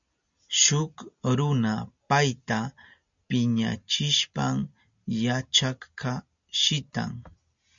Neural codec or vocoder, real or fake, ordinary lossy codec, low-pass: none; real; MP3, 64 kbps; 7.2 kHz